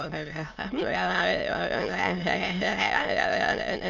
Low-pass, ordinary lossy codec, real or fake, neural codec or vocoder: 7.2 kHz; Opus, 64 kbps; fake; autoencoder, 22.05 kHz, a latent of 192 numbers a frame, VITS, trained on many speakers